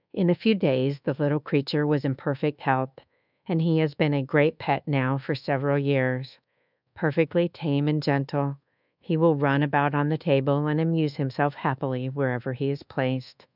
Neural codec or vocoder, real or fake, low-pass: codec, 24 kHz, 1.2 kbps, DualCodec; fake; 5.4 kHz